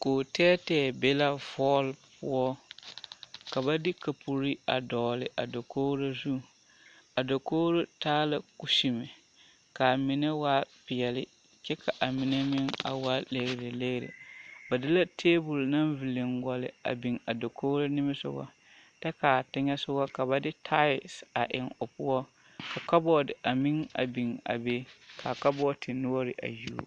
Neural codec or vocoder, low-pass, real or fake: none; 9.9 kHz; real